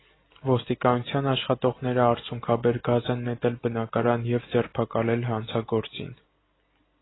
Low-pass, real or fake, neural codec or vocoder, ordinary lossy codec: 7.2 kHz; real; none; AAC, 16 kbps